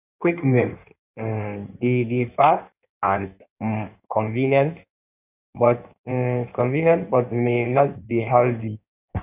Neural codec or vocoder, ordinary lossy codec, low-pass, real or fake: codec, 16 kHz in and 24 kHz out, 1.1 kbps, FireRedTTS-2 codec; none; 3.6 kHz; fake